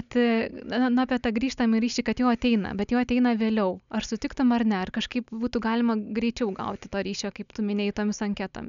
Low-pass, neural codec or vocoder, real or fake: 7.2 kHz; none; real